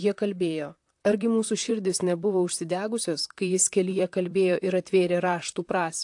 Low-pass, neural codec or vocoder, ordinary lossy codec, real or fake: 10.8 kHz; vocoder, 44.1 kHz, 128 mel bands, Pupu-Vocoder; AAC, 64 kbps; fake